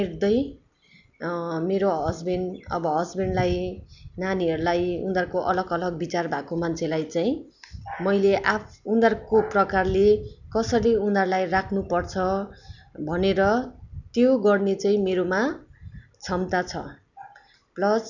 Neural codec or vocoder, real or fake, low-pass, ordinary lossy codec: none; real; 7.2 kHz; none